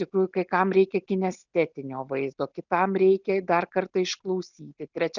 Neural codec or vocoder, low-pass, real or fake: none; 7.2 kHz; real